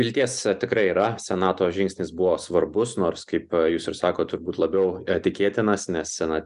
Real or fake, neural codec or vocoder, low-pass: real; none; 10.8 kHz